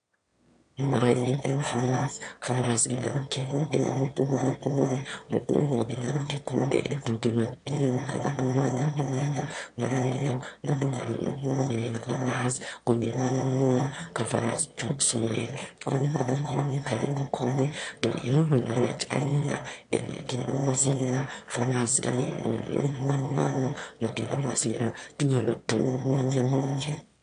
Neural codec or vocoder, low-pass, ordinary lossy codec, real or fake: autoencoder, 22.05 kHz, a latent of 192 numbers a frame, VITS, trained on one speaker; 9.9 kHz; AAC, 64 kbps; fake